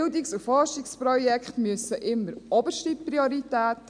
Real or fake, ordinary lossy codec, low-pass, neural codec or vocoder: real; none; 9.9 kHz; none